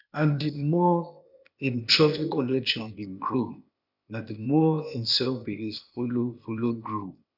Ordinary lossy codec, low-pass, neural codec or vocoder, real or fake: none; 5.4 kHz; codec, 16 kHz, 0.8 kbps, ZipCodec; fake